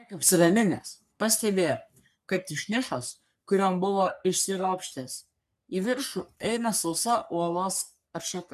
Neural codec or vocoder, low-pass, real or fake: codec, 44.1 kHz, 3.4 kbps, Pupu-Codec; 14.4 kHz; fake